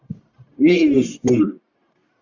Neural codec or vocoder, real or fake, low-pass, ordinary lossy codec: codec, 44.1 kHz, 1.7 kbps, Pupu-Codec; fake; 7.2 kHz; Opus, 64 kbps